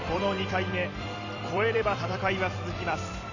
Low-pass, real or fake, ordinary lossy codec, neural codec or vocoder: 7.2 kHz; fake; none; vocoder, 44.1 kHz, 128 mel bands every 256 samples, BigVGAN v2